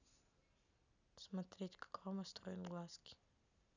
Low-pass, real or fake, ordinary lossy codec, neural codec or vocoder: 7.2 kHz; real; none; none